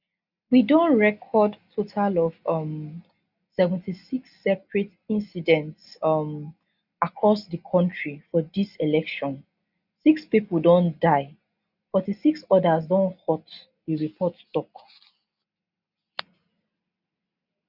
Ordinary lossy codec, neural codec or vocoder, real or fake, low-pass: none; none; real; 5.4 kHz